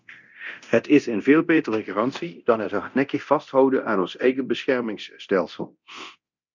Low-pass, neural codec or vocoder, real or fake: 7.2 kHz; codec, 24 kHz, 0.9 kbps, DualCodec; fake